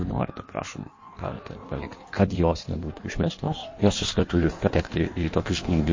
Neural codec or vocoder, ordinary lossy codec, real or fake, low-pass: codec, 16 kHz in and 24 kHz out, 1.1 kbps, FireRedTTS-2 codec; MP3, 32 kbps; fake; 7.2 kHz